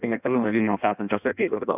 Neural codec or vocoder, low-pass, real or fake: codec, 16 kHz in and 24 kHz out, 0.6 kbps, FireRedTTS-2 codec; 3.6 kHz; fake